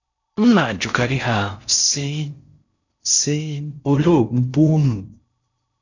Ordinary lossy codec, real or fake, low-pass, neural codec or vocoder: none; fake; 7.2 kHz; codec, 16 kHz in and 24 kHz out, 0.6 kbps, FocalCodec, streaming, 4096 codes